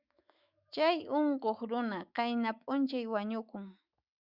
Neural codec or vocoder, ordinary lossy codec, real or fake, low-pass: autoencoder, 48 kHz, 128 numbers a frame, DAC-VAE, trained on Japanese speech; Opus, 64 kbps; fake; 5.4 kHz